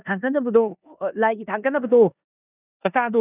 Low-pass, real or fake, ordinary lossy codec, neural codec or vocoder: 3.6 kHz; fake; none; codec, 16 kHz in and 24 kHz out, 0.9 kbps, LongCat-Audio-Codec, four codebook decoder